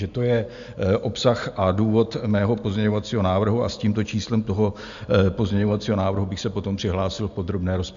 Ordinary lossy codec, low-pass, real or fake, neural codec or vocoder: MP3, 64 kbps; 7.2 kHz; real; none